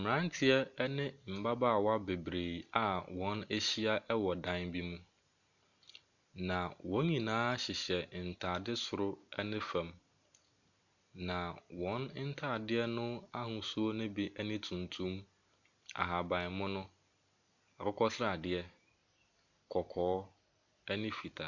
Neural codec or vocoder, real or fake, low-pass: none; real; 7.2 kHz